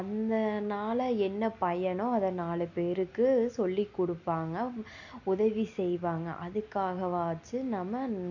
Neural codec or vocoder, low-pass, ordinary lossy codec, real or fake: none; 7.2 kHz; none; real